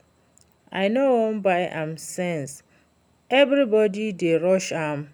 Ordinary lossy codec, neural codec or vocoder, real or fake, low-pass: none; none; real; 19.8 kHz